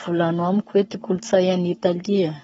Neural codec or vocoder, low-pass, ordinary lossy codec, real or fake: codec, 44.1 kHz, 7.8 kbps, Pupu-Codec; 19.8 kHz; AAC, 24 kbps; fake